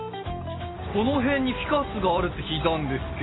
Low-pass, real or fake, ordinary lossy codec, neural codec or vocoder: 7.2 kHz; real; AAC, 16 kbps; none